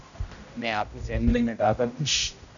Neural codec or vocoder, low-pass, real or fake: codec, 16 kHz, 0.5 kbps, X-Codec, HuBERT features, trained on general audio; 7.2 kHz; fake